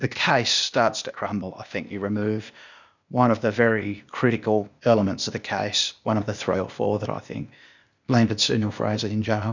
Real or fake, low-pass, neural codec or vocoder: fake; 7.2 kHz; codec, 16 kHz, 0.8 kbps, ZipCodec